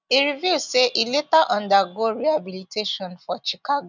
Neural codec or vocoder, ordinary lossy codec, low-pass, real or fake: none; none; 7.2 kHz; real